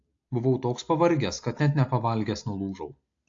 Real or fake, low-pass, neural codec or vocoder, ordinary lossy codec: real; 7.2 kHz; none; AAC, 48 kbps